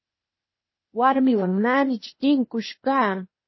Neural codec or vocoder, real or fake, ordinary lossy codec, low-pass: codec, 16 kHz, 0.8 kbps, ZipCodec; fake; MP3, 24 kbps; 7.2 kHz